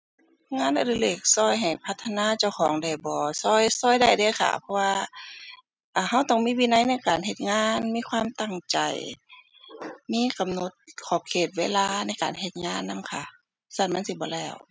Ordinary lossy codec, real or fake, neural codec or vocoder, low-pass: none; real; none; none